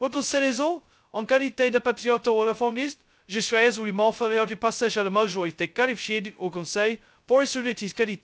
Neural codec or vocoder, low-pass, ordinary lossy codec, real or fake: codec, 16 kHz, 0.2 kbps, FocalCodec; none; none; fake